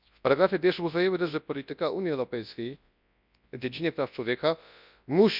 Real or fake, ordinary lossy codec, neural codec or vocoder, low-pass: fake; none; codec, 24 kHz, 0.9 kbps, WavTokenizer, large speech release; 5.4 kHz